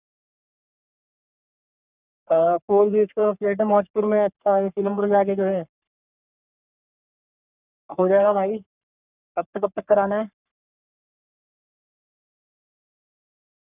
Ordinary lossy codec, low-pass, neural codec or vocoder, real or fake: none; 3.6 kHz; codec, 44.1 kHz, 3.4 kbps, Pupu-Codec; fake